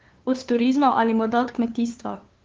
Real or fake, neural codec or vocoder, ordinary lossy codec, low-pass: fake; codec, 16 kHz, 2 kbps, FunCodec, trained on LibriTTS, 25 frames a second; Opus, 16 kbps; 7.2 kHz